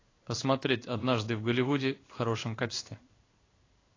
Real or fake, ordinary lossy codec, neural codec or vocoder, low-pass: fake; AAC, 32 kbps; codec, 16 kHz in and 24 kHz out, 1 kbps, XY-Tokenizer; 7.2 kHz